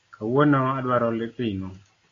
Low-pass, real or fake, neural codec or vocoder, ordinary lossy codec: 7.2 kHz; real; none; AAC, 32 kbps